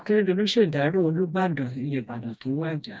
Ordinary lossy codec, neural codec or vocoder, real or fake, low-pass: none; codec, 16 kHz, 1 kbps, FreqCodec, smaller model; fake; none